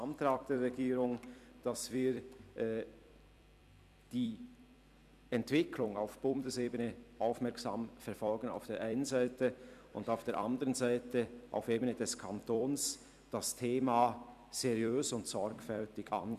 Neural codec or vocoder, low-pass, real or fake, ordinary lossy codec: vocoder, 44.1 kHz, 128 mel bands every 256 samples, BigVGAN v2; 14.4 kHz; fake; none